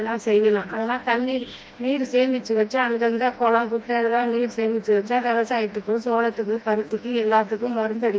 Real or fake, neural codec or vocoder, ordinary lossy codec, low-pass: fake; codec, 16 kHz, 1 kbps, FreqCodec, smaller model; none; none